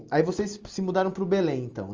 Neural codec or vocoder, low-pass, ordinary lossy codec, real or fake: none; 7.2 kHz; Opus, 32 kbps; real